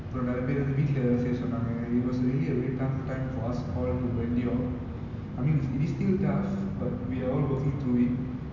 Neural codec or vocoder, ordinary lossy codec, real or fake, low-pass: none; none; real; 7.2 kHz